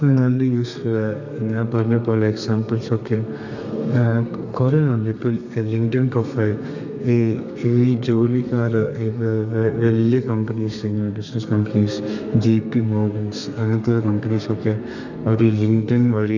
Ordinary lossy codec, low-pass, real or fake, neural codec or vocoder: none; 7.2 kHz; fake; codec, 32 kHz, 1.9 kbps, SNAC